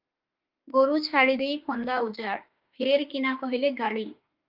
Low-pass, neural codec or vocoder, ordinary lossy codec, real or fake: 5.4 kHz; autoencoder, 48 kHz, 32 numbers a frame, DAC-VAE, trained on Japanese speech; Opus, 24 kbps; fake